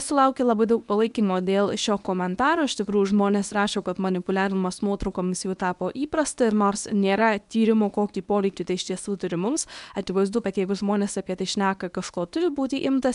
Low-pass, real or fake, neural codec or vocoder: 10.8 kHz; fake; codec, 24 kHz, 0.9 kbps, WavTokenizer, medium speech release version 1